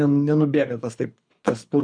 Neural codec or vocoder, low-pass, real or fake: codec, 24 kHz, 6 kbps, HILCodec; 9.9 kHz; fake